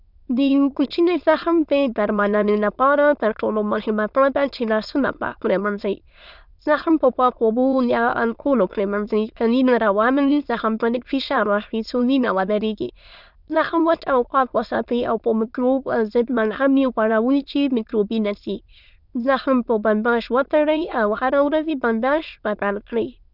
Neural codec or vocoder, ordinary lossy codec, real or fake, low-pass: autoencoder, 22.05 kHz, a latent of 192 numbers a frame, VITS, trained on many speakers; none; fake; 5.4 kHz